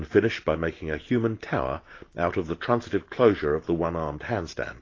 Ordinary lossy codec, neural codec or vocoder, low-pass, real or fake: AAC, 32 kbps; none; 7.2 kHz; real